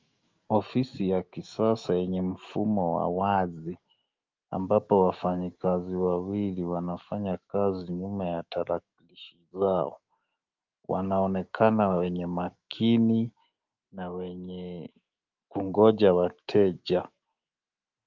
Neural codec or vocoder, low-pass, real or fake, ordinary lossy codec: autoencoder, 48 kHz, 128 numbers a frame, DAC-VAE, trained on Japanese speech; 7.2 kHz; fake; Opus, 32 kbps